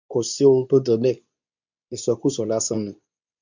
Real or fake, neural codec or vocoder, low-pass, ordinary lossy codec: fake; codec, 24 kHz, 0.9 kbps, WavTokenizer, medium speech release version 2; 7.2 kHz; none